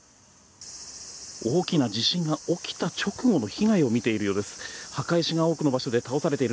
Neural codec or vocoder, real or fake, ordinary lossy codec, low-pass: none; real; none; none